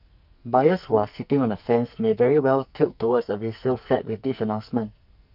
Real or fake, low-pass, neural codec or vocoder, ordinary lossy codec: fake; 5.4 kHz; codec, 44.1 kHz, 2.6 kbps, SNAC; none